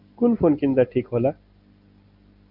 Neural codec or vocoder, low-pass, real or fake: none; 5.4 kHz; real